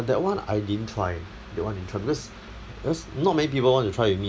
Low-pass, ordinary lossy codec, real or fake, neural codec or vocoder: none; none; real; none